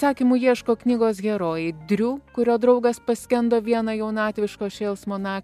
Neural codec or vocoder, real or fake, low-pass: none; real; 14.4 kHz